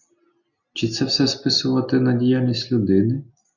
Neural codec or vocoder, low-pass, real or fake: none; 7.2 kHz; real